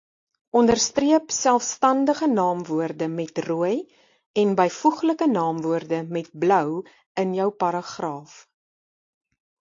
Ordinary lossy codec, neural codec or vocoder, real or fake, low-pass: AAC, 48 kbps; none; real; 7.2 kHz